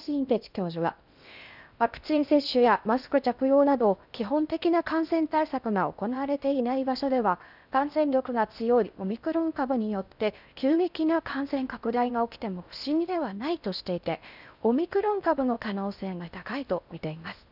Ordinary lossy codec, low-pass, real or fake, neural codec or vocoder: none; 5.4 kHz; fake; codec, 16 kHz in and 24 kHz out, 0.6 kbps, FocalCodec, streaming, 2048 codes